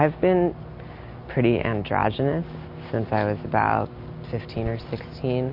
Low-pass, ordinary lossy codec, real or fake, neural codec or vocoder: 5.4 kHz; MP3, 32 kbps; real; none